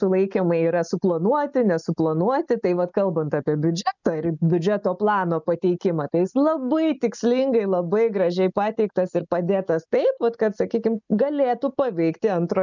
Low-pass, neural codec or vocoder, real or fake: 7.2 kHz; none; real